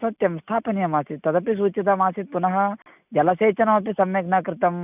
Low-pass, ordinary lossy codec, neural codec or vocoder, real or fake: 3.6 kHz; none; none; real